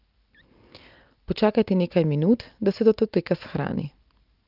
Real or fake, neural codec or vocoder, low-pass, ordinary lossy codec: real; none; 5.4 kHz; Opus, 32 kbps